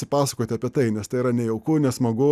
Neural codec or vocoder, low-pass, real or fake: none; 14.4 kHz; real